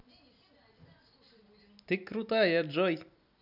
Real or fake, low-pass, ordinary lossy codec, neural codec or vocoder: real; 5.4 kHz; none; none